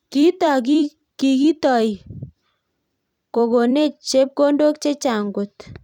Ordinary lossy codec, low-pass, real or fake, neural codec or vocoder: none; 19.8 kHz; fake; vocoder, 44.1 kHz, 128 mel bands every 256 samples, BigVGAN v2